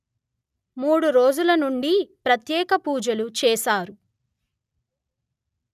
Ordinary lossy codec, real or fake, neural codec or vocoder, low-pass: none; real; none; 14.4 kHz